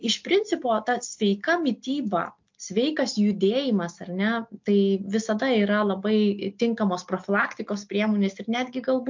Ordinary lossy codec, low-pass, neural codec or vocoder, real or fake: MP3, 48 kbps; 7.2 kHz; none; real